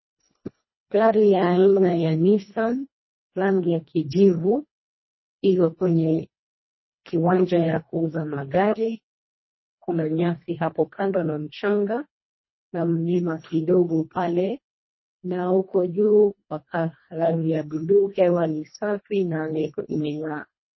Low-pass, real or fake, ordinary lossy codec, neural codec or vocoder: 7.2 kHz; fake; MP3, 24 kbps; codec, 24 kHz, 1.5 kbps, HILCodec